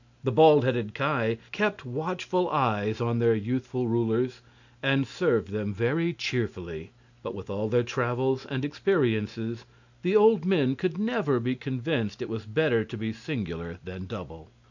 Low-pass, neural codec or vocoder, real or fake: 7.2 kHz; none; real